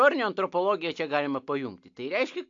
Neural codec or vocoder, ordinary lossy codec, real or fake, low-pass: none; AAC, 64 kbps; real; 7.2 kHz